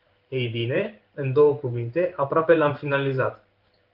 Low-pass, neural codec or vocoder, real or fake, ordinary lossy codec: 5.4 kHz; codec, 16 kHz in and 24 kHz out, 1 kbps, XY-Tokenizer; fake; Opus, 32 kbps